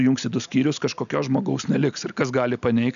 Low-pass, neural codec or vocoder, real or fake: 7.2 kHz; none; real